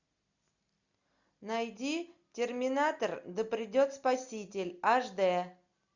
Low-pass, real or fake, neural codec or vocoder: 7.2 kHz; real; none